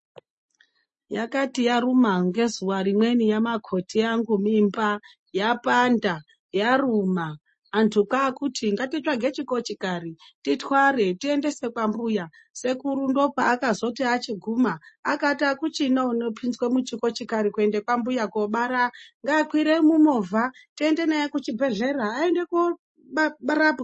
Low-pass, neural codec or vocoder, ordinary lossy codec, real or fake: 9.9 kHz; none; MP3, 32 kbps; real